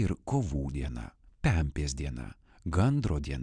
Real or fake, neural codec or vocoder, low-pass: real; none; 9.9 kHz